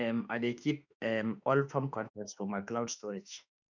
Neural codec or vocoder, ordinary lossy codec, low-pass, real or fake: autoencoder, 48 kHz, 32 numbers a frame, DAC-VAE, trained on Japanese speech; none; 7.2 kHz; fake